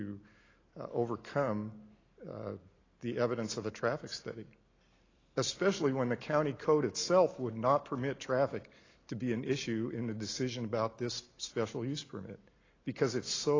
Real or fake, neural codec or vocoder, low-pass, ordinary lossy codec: real; none; 7.2 kHz; AAC, 32 kbps